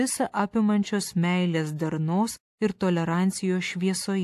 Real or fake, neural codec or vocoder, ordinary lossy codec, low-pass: real; none; AAC, 64 kbps; 14.4 kHz